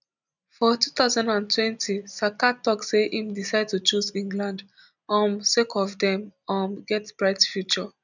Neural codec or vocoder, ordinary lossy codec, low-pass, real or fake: none; none; 7.2 kHz; real